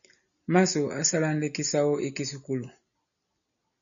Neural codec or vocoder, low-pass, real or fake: none; 7.2 kHz; real